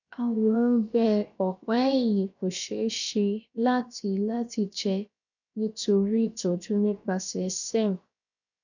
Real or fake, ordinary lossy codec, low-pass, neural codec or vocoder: fake; none; 7.2 kHz; codec, 16 kHz, 0.7 kbps, FocalCodec